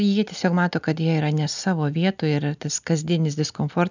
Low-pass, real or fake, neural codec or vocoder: 7.2 kHz; real; none